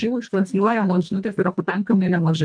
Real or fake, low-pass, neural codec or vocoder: fake; 9.9 kHz; codec, 24 kHz, 1.5 kbps, HILCodec